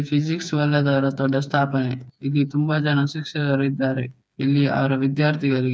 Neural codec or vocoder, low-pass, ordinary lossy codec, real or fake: codec, 16 kHz, 4 kbps, FreqCodec, smaller model; none; none; fake